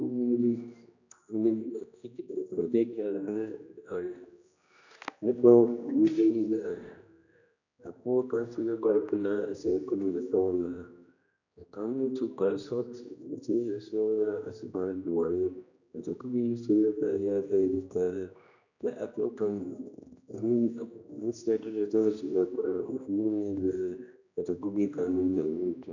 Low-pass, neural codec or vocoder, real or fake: 7.2 kHz; codec, 16 kHz, 1 kbps, X-Codec, HuBERT features, trained on general audio; fake